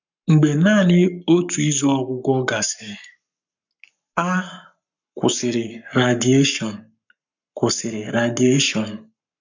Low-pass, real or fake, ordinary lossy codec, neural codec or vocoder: 7.2 kHz; fake; none; codec, 44.1 kHz, 7.8 kbps, Pupu-Codec